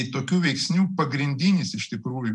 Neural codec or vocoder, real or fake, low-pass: none; real; 10.8 kHz